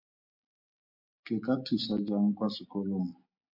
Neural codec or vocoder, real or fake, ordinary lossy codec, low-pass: none; real; MP3, 48 kbps; 5.4 kHz